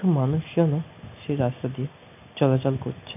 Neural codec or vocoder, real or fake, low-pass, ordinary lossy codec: none; real; 3.6 kHz; none